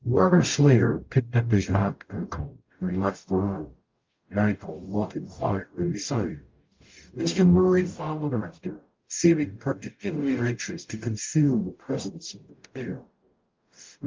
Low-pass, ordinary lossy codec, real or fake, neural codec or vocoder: 7.2 kHz; Opus, 24 kbps; fake; codec, 44.1 kHz, 0.9 kbps, DAC